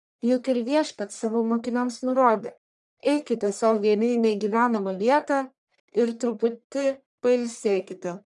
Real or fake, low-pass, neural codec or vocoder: fake; 10.8 kHz; codec, 44.1 kHz, 1.7 kbps, Pupu-Codec